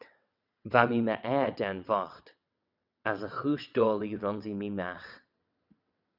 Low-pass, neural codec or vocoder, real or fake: 5.4 kHz; vocoder, 22.05 kHz, 80 mel bands, WaveNeXt; fake